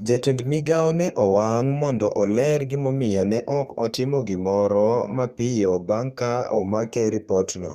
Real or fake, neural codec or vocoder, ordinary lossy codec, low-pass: fake; codec, 32 kHz, 1.9 kbps, SNAC; Opus, 64 kbps; 14.4 kHz